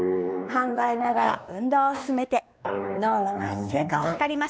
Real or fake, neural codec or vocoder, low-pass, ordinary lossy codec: fake; codec, 16 kHz, 2 kbps, X-Codec, WavLM features, trained on Multilingual LibriSpeech; none; none